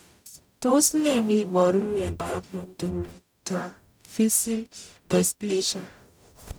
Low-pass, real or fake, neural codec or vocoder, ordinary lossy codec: none; fake; codec, 44.1 kHz, 0.9 kbps, DAC; none